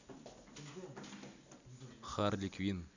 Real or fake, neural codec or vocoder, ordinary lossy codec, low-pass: real; none; none; 7.2 kHz